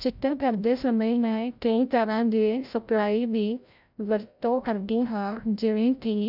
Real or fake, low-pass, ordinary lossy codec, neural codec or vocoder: fake; 5.4 kHz; none; codec, 16 kHz, 0.5 kbps, FreqCodec, larger model